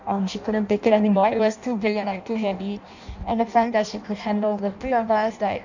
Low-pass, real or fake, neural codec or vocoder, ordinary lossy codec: 7.2 kHz; fake; codec, 16 kHz in and 24 kHz out, 0.6 kbps, FireRedTTS-2 codec; none